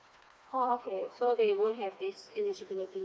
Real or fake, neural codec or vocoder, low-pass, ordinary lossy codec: fake; codec, 16 kHz, 2 kbps, FreqCodec, smaller model; none; none